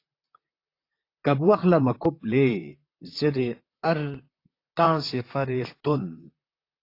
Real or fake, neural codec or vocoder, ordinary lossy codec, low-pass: fake; vocoder, 44.1 kHz, 128 mel bands, Pupu-Vocoder; AAC, 32 kbps; 5.4 kHz